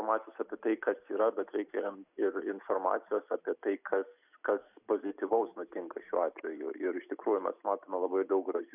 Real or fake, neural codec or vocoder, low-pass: real; none; 3.6 kHz